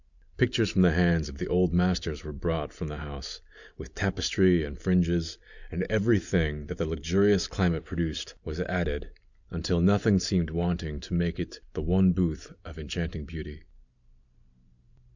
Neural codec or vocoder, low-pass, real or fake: none; 7.2 kHz; real